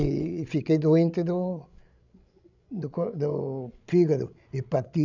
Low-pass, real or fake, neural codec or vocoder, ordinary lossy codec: 7.2 kHz; fake; codec, 16 kHz, 16 kbps, FreqCodec, larger model; none